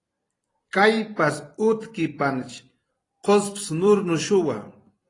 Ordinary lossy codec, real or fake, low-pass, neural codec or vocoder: AAC, 32 kbps; fake; 10.8 kHz; vocoder, 44.1 kHz, 128 mel bands every 256 samples, BigVGAN v2